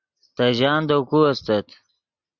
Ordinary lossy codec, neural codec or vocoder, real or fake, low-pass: Opus, 64 kbps; none; real; 7.2 kHz